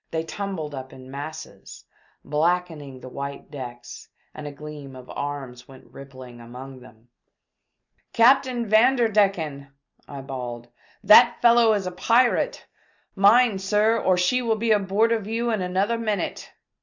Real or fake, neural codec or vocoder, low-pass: real; none; 7.2 kHz